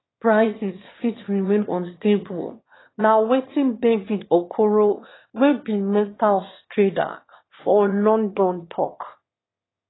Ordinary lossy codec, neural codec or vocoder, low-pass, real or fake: AAC, 16 kbps; autoencoder, 22.05 kHz, a latent of 192 numbers a frame, VITS, trained on one speaker; 7.2 kHz; fake